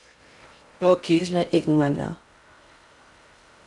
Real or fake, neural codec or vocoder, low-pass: fake; codec, 16 kHz in and 24 kHz out, 0.6 kbps, FocalCodec, streaming, 4096 codes; 10.8 kHz